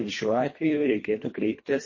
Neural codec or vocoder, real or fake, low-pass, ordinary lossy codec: codec, 24 kHz, 1.5 kbps, HILCodec; fake; 7.2 kHz; MP3, 32 kbps